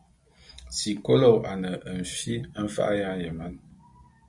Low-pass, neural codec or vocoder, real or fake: 10.8 kHz; none; real